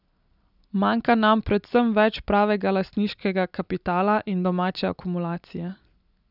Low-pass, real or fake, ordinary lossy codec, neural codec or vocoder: 5.4 kHz; real; none; none